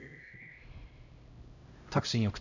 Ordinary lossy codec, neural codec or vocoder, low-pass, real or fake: none; codec, 16 kHz, 0.5 kbps, X-Codec, HuBERT features, trained on LibriSpeech; 7.2 kHz; fake